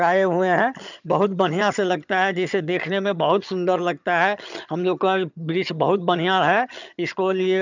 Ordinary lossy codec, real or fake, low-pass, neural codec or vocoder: none; fake; 7.2 kHz; vocoder, 22.05 kHz, 80 mel bands, HiFi-GAN